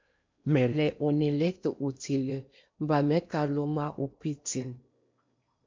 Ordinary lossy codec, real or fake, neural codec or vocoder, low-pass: MP3, 64 kbps; fake; codec, 16 kHz in and 24 kHz out, 0.8 kbps, FocalCodec, streaming, 65536 codes; 7.2 kHz